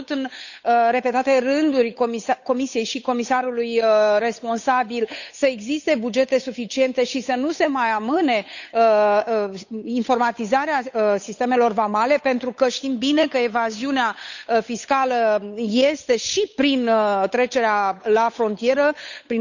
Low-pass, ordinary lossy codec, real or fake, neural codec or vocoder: 7.2 kHz; none; fake; codec, 16 kHz, 8 kbps, FunCodec, trained on Chinese and English, 25 frames a second